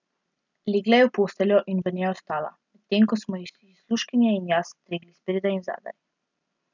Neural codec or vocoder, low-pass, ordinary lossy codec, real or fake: none; 7.2 kHz; none; real